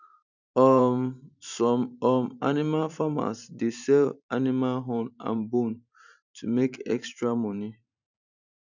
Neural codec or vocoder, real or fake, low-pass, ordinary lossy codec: vocoder, 44.1 kHz, 128 mel bands every 512 samples, BigVGAN v2; fake; 7.2 kHz; none